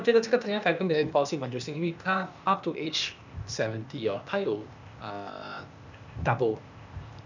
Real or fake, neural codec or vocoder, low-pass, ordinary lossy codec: fake; codec, 16 kHz, 0.8 kbps, ZipCodec; 7.2 kHz; none